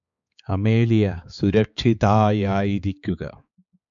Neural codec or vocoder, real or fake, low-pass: codec, 16 kHz, 4 kbps, X-Codec, HuBERT features, trained on balanced general audio; fake; 7.2 kHz